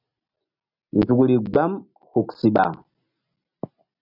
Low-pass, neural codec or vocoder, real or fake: 5.4 kHz; none; real